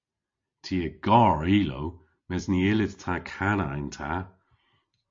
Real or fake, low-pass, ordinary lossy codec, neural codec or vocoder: real; 7.2 kHz; MP3, 48 kbps; none